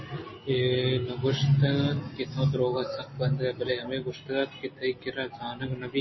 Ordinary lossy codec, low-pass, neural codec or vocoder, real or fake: MP3, 24 kbps; 7.2 kHz; none; real